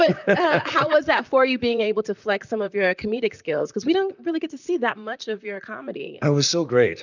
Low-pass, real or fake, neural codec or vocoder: 7.2 kHz; fake; vocoder, 44.1 kHz, 128 mel bands, Pupu-Vocoder